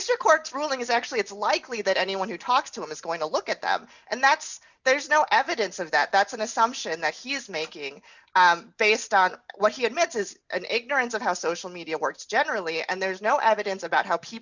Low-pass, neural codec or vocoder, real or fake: 7.2 kHz; none; real